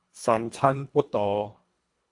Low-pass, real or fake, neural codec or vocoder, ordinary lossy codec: 10.8 kHz; fake; codec, 24 kHz, 1.5 kbps, HILCodec; AAC, 64 kbps